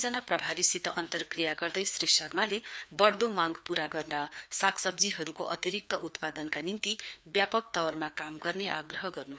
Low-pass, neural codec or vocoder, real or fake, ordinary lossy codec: none; codec, 16 kHz, 2 kbps, FreqCodec, larger model; fake; none